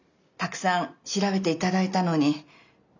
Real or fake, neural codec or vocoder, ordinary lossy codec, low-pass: real; none; none; 7.2 kHz